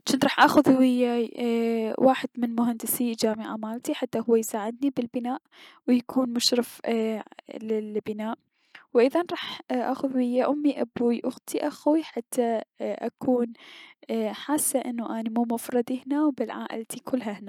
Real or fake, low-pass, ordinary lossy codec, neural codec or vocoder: real; 19.8 kHz; none; none